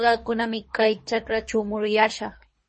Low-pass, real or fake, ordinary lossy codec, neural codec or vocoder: 10.8 kHz; fake; MP3, 32 kbps; codec, 24 kHz, 3 kbps, HILCodec